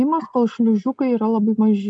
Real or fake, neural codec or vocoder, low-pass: real; none; 9.9 kHz